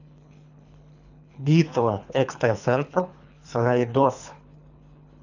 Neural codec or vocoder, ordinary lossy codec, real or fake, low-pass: codec, 24 kHz, 3 kbps, HILCodec; none; fake; 7.2 kHz